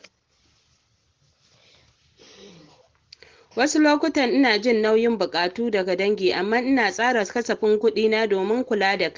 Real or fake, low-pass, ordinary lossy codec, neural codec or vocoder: real; 7.2 kHz; Opus, 16 kbps; none